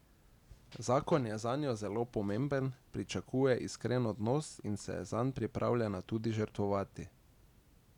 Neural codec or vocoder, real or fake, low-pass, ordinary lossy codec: none; real; 19.8 kHz; none